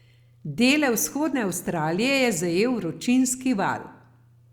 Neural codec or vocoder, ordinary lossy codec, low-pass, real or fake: none; Opus, 64 kbps; 19.8 kHz; real